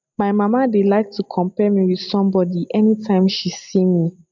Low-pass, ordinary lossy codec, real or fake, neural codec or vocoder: 7.2 kHz; MP3, 64 kbps; real; none